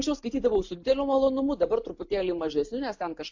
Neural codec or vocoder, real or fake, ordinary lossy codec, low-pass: none; real; MP3, 48 kbps; 7.2 kHz